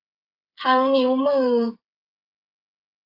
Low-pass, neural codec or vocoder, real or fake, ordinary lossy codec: 5.4 kHz; codec, 16 kHz, 8 kbps, FreqCodec, smaller model; fake; AAC, 48 kbps